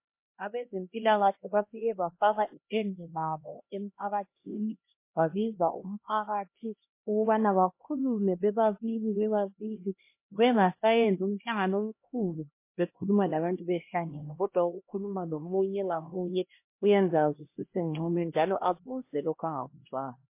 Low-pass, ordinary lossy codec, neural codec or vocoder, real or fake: 3.6 kHz; MP3, 24 kbps; codec, 16 kHz, 1 kbps, X-Codec, HuBERT features, trained on LibriSpeech; fake